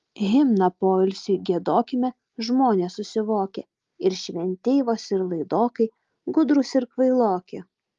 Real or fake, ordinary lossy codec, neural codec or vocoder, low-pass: real; Opus, 32 kbps; none; 7.2 kHz